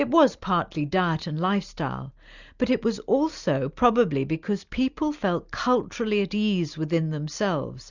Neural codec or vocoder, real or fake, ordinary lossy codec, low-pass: none; real; Opus, 64 kbps; 7.2 kHz